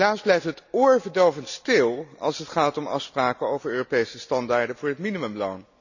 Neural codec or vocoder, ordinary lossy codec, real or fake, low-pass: none; none; real; 7.2 kHz